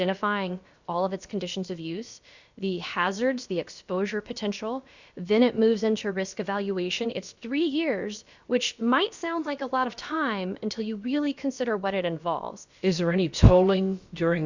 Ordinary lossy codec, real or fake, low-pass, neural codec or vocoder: Opus, 64 kbps; fake; 7.2 kHz; codec, 16 kHz, about 1 kbps, DyCAST, with the encoder's durations